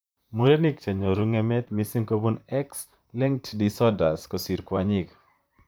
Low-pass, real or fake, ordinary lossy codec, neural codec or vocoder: none; fake; none; vocoder, 44.1 kHz, 128 mel bands, Pupu-Vocoder